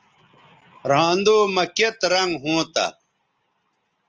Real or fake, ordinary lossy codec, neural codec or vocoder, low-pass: real; Opus, 24 kbps; none; 7.2 kHz